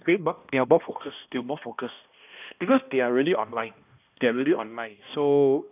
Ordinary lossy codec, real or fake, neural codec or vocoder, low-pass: none; fake; codec, 16 kHz, 1 kbps, X-Codec, HuBERT features, trained on balanced general audio; 3.6 kHz